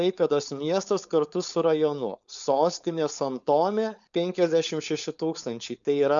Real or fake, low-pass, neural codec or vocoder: fake; 7.2 kHz; codec, 16 kHz, 4.8 kbps, FACodec